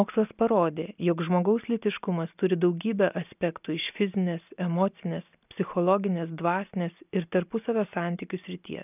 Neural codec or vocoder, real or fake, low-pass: none; real; 3.6 kHz